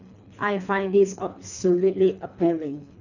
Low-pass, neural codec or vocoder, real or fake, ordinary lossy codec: 7.2 kHz; codec, 24 kHz, 3 kbps, HILCodec; fake; none